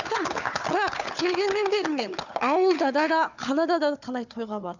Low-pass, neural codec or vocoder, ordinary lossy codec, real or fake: 7.2 kHz; codec, 16 kHz, 4 kbps, FunCodec, trained on Chinese and English, 50 frames a second; none; fake